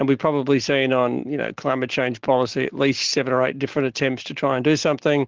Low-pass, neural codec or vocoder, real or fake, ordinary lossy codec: 7.2 kHz; none; real; Opus, 16 kbps